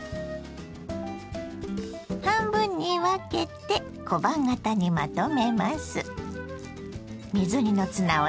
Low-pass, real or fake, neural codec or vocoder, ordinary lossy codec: none; real; none; none